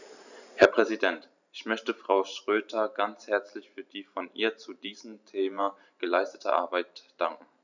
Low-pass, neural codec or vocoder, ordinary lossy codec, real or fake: 7.2 kHz; vocoder, 44.1 kHz, 128 mel bands every 512 samples, BigVGAN v2; none; fake